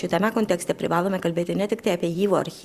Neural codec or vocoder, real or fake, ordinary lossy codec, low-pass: vocoder, 44.1 kHz, 128 mel bands every 512 samples, BigVGAN v2; fake; Opus, 64 kbps; 14.4 kHz